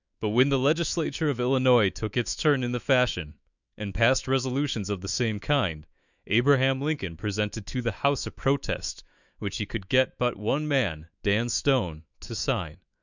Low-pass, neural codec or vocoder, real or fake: 7.2 kHz; autoencoder, 48 kHz, 128 numbers a frame, DAC-VAE, trained on Japanese speech; fake